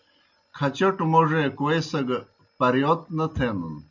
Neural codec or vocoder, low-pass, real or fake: none; 7.2 kHz; real